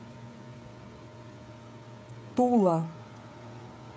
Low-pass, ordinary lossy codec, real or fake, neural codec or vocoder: none; none; fake; codec, 16 kHz, 16 kbps, FreqCodec, smaller model